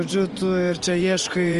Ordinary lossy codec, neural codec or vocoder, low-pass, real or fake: Opus, 24 kbps; vocoder, 24 kHz, 100 mel bands, Vocos; 10.8 kHz; fake